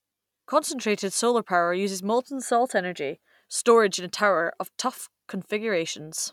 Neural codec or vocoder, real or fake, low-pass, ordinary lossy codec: none; real; 19.8 kHz; none